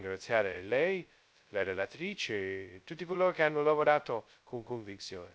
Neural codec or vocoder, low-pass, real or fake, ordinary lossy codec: codec, 16 kHz, 0.2 kbps, FocalCodec; none; fake; none